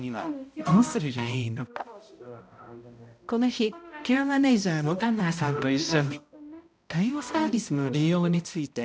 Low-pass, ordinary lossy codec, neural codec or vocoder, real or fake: none; none; codec, 16 kHz, 0.5 kbps, X-Codec, HuBERT features, trained on balanced general audio; fake